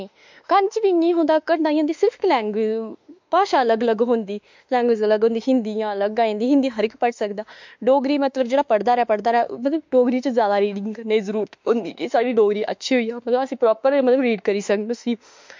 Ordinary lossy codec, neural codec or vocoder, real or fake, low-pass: MP3, 64 kbps; autoencoder, 48 kHz, 32 numbers a frame, DAC-VAE, trained on Japanese speech; fake; 7.2 kHz